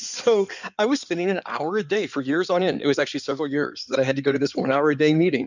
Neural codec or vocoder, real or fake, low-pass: codec, 16 kHz in and 24 kHz out, 2.2 kbps, FireRedTTS-2 codec; fake; 7.2 kHz